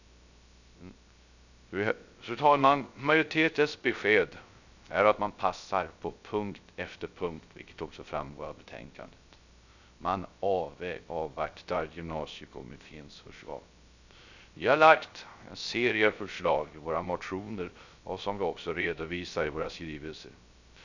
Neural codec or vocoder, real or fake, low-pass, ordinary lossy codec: codec, 16 kHz, 0.3 kbps, FocalCodec; fake; 7.2 kHz; none